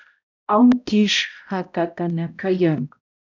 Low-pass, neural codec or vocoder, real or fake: 7.2 kHz; codec, 16 kHz, 0.5 kbps, X-Codec, HuBERT features, trained on balanced general audio; fake